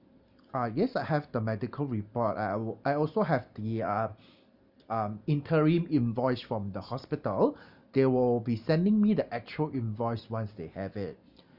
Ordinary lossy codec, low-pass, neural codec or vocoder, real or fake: Opus, 64 kbps; 5.4 kHz; none; real